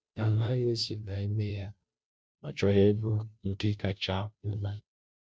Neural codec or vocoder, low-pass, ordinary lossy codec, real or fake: codec, 16 kHz, 0.5 kbps, FunCodec, trained on Chinese and English, 25 frames a second; none; none; fake